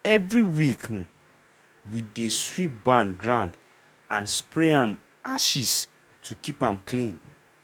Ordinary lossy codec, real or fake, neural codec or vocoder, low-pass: none; fake; codec, 44.1 kHz, 2.6 kbps, DAC; 19.8 kHz